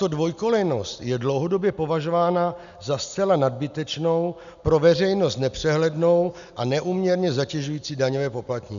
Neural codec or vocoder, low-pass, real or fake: none; 7.2 kHz; real